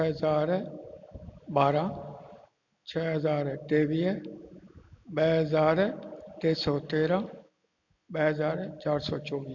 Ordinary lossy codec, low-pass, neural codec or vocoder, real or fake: MP3, 48 kbps; 7.2 kHz; none; real